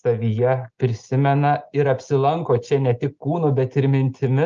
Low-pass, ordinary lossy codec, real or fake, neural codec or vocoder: 7.2 kHz; Opus, 32 kbps; real; none